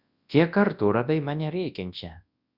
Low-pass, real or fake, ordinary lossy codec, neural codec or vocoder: 5.4 kHz; fake; Opus, 64 kbps; codec, 24 kHz, 0.9 kbps, WavTokenizer, large speech release